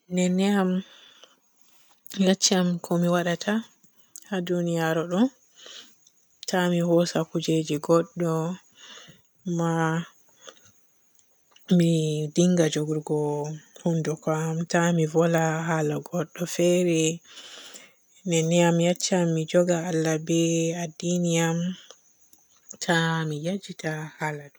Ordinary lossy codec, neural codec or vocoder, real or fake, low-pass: none; none; real; none